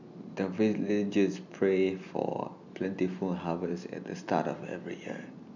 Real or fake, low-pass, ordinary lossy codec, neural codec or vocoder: real; 7.2 kHz; none; none